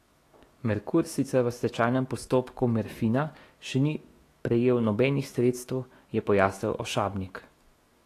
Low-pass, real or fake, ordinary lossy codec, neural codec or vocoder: 14.4 kHz; fake; AAC, 48 kbps; autoencoder, 48 kHz, 128 numbers a frame, DAC-VAE, trained on Japanese speech